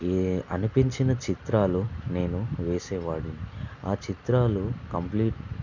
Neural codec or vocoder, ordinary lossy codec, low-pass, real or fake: none; none; 7.2 kHz; real